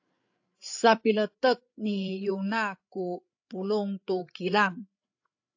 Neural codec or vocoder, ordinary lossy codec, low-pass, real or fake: codec, 16 kHz, 8 kbps, FreqCodec, larger model; AAC, 48 kbps; 7.2 kHz; fake